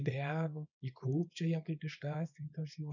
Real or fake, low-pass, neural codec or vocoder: fake; 7.2 kHz; codec, 24 kHz, 0.9 kbps, WavTokenizer, small release